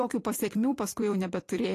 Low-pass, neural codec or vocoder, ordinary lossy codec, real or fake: 14.4 kHz; vocoder, 44.1 kHz, 128 mel bands every 256 samples, BigVGAN v2; AAC, 48 kbps; fake